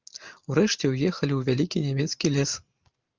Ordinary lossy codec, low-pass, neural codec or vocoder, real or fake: Opus, 32 kbps; 7.2 kHz; none; real